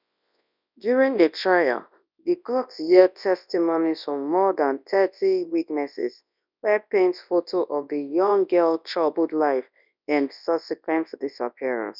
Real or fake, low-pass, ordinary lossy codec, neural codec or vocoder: fake; 5.4 kHz; none; codec, 24 kHz, 0.9 kbps, WavTokenizer, large speech release